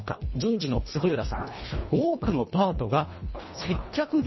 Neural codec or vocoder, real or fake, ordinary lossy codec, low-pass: codec, 24 kHz, 1.5 kbps, HILCodec; fake; MP3, 24 kbps; 7.2 kHz